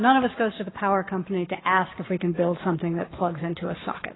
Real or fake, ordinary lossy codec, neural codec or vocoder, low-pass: fake; AAC, 16 kbps; codec, 44.1 kHz, 7.8 kbps, Pupu-Codec; 7.2 kHz